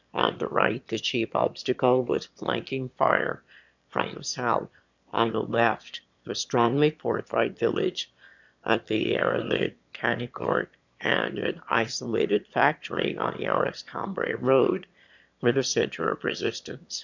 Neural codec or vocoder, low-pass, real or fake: autoencoder, 22.05 kHz, a latent of 192 numbers a frame, VITS, trained on one speaker; 7.2 kHz; fake